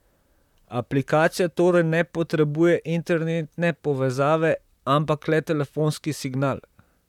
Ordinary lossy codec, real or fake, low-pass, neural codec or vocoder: none; fake; 19.8 kHz; vocoder, 44.1 kHz, 128 mel bands, Pupu-Vocoder